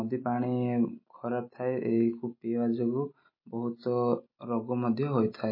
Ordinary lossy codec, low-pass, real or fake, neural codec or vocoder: MP3, 24 kbps; 5.4 kHz; real; none